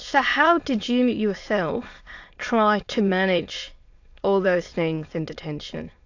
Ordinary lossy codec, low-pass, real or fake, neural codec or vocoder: AAC, 48 kbps; 7.2 kHz; fake; autoencoder, 22.05 kHz, a latent of 192 numbers a frame, VITS, trained on many speakers